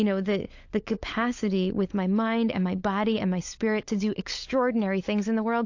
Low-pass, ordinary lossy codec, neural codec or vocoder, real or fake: 7.2 kHz; AAC, 48 kbps; codec, 16 kHz, 16 kbps, FunCodec, trained on LibriTTS, 50 frames a second; fake